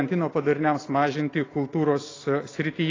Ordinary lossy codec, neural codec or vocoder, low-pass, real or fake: AAC, 32 kbps; none; 7.2 kHz; real